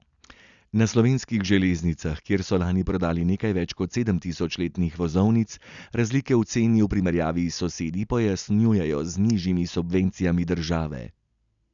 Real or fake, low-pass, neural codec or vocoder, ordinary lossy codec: real; 7.2 kHz; none; none